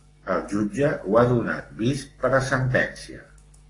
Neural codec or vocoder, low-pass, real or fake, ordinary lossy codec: codec, 44.1 kHz, 7.8 kbps, Pupu-Codec; 10.8 kHz; fake; AAC, 32 kbps